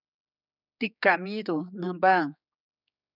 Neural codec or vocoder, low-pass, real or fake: codec, 16 kHz, 4 kbps, X-Codec, HuBERT features, trained on general audio; 5.4 kHz; fake